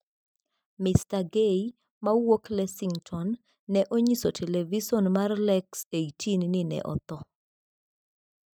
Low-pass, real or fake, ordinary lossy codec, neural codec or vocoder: none; real; none; none